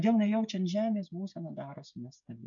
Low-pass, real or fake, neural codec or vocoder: 7.2 kHz; fake; codec, 16 kHz, 16 kbps, FreqCodec, smaller model